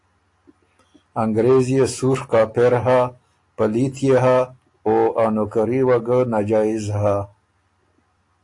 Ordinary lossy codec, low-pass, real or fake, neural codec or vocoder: AAC, 48 kbps; 10.8 kHz; real; none